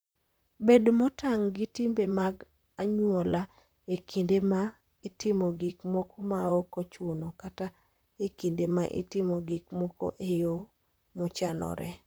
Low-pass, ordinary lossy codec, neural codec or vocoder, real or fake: none; none; vocoder, 44.1 kHz, 128 mel bands, Pupu-Vocoder; fake